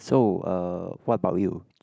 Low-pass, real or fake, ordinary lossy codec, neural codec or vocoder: none; real; none; none